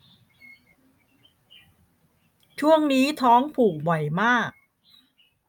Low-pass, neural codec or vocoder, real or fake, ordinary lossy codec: 19.8 kHz; none; real; none